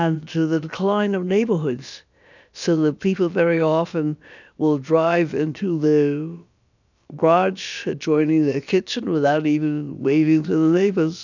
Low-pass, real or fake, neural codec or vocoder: 7.2 kHz; fake; codec, 16 kHz, about 1 kbps, DyCAST, with the encoder's durations